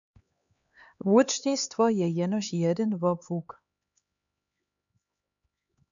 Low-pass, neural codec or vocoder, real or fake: 7.2 kHz; codec, 16 kHz, 4 kbps, X-Codec, HuBERT features, trained on LibriSpeech; fake